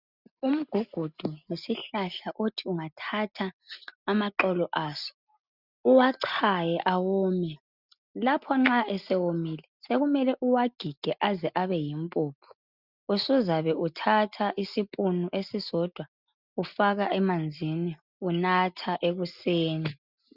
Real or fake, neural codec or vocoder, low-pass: real; none; 5.4 kHz